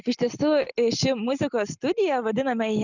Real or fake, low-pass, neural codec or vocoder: real; 7.2 kHz; none